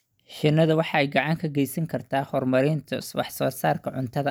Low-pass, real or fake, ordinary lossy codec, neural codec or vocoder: none; real; none; none